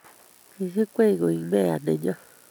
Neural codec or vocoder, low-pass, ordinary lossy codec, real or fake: none; none; none; real